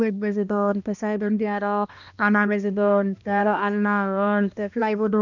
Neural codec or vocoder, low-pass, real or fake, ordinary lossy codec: codec, 16 kHz, 1 kbps, X-Codec, HuBERT features, trained on balanced general audio; 7.2 kHz; fake; none